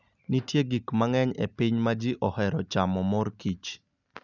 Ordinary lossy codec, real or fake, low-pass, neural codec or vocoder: none; real; 7.2 kHz; none